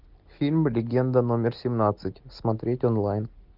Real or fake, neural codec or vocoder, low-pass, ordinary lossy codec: real; none; 5.4 kHz; Opus, 32 kbps